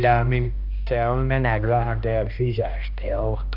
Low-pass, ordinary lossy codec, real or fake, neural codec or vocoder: 5.4 kHz; none; fake; codec, 16 kHz, 1 kbps, X-Codec, HuBERT features, trained on balanced general audio